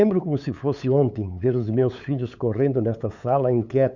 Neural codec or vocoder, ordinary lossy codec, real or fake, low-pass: codec, 16 kHz, 8 kbps, FunCodec, trained on LibriTTS, 25 frames a second; none; fake; 7.2 kHz